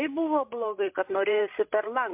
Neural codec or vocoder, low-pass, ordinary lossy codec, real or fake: codec, 16 kHz, 16 kbps, FreqCodec, larger model; 3.6 kHz; MP3, 32 kbps; fake